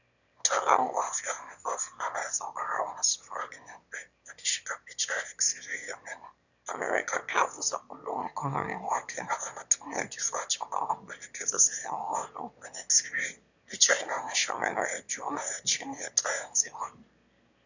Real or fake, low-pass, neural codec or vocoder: fake; 7.2 kHz; autoencoder, 22.05 kHz, a latent of 192 numbers a frame, VITS, trained on one speaker